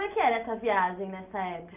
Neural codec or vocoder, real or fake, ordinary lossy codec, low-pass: none; real; none; 3.6 kHz